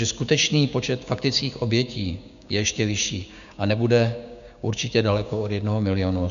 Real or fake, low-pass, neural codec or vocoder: fake; 7.2 kHz; codec, 16 kHz, 6 kbps, DAC